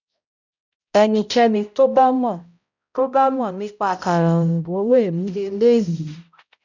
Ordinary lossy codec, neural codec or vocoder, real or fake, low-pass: none; codec, 16 kHz, 0.5 kbps, X-Codec, HuBERT features, trained on balanced general audio; fake; 7.2 kHz